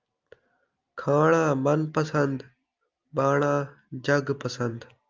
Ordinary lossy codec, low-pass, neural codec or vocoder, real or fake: Opus, 24 kbps; 7.2 kHz; none; real